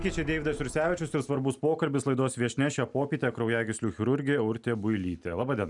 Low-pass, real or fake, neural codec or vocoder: 10.8 kHz; real; none